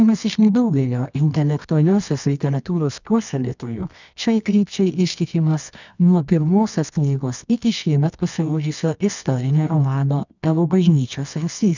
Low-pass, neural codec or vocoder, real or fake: 7.2 kHz; codec, 24 kHz, 0.9 kbps, WavTokenizer, medium music audio release; fake